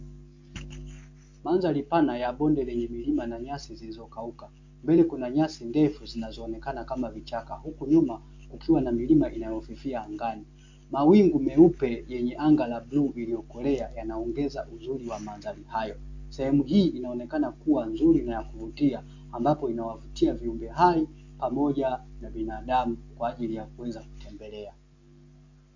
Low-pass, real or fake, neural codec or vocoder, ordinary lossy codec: 7.2 kHz; real; none; MP3, 48 kbps